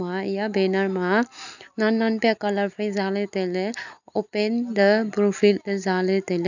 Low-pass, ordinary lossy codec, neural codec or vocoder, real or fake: 7.2 kHz; none; none; real